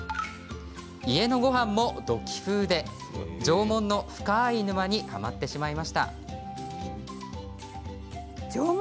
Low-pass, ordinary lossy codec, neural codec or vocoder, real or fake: none; none; none; real